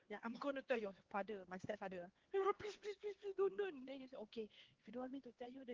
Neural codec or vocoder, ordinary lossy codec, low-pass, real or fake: codec, 16 kHz, 4 kbps, X-Codec, HuBERT features, trained on LibriSpeech; Opus, 16 kbps; 7.2 kHz; fake